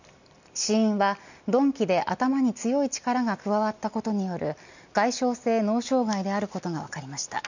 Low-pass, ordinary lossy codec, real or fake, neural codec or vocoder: 7.2 kHz; none; real; none